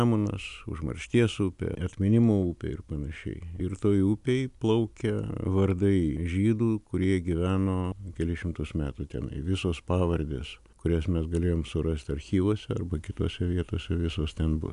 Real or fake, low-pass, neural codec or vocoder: real; 10.8 kHz; none